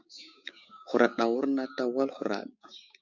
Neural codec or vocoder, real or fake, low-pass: codec, 16 kHz, 6 kbps, DAC; fake; 7.2 kHz